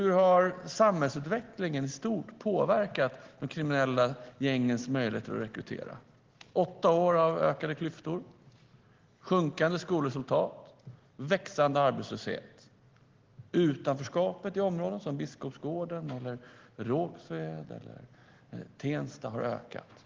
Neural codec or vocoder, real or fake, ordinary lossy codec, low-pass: none; real; Opus, 16 kbps; 7.2 kHz